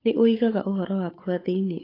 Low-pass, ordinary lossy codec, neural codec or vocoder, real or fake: 5.4 kHz; AAC, 24 kbps; codec, 16 kHz, 8 kbps, FunCodec, trained on Chinese and English, 25 frames a second; fake